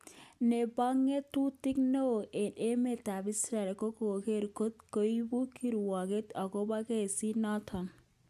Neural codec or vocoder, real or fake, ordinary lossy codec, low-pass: none; real; none; 14.4 kHz